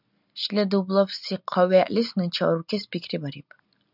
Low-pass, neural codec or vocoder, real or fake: 5.4 kHz; none; real